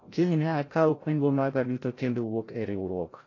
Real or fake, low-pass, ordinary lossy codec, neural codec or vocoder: fake; 7.2 kHz; AAC, 32 kbps; codec, 16 kHz, 0.5 kbps, FreqCodec, larger model